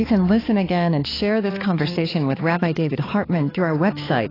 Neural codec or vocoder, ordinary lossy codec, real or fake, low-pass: codec, 16 kHz, 4 kbps, X-Codec, HuBERT features, trained on balanced general audio; AAC, 24 kbps; fake; 5.4 kHz